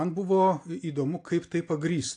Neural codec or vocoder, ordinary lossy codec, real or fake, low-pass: none; AAC, 48 kbps; real; 9.9 kHz